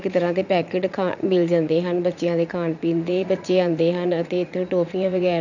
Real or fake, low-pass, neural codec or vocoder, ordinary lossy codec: fake; 7.2 kHz; vocoder, 44.1 kHz, 80 mel bands, Vocos; none